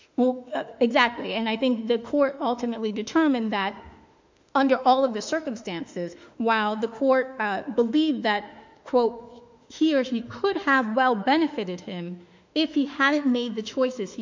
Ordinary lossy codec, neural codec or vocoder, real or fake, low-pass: MP3, 64 kbps; autoencoder, 48 kHz, 32 numbers a frame, DAC-VAE, trained on Japanese speech; fake; 7.2 kHz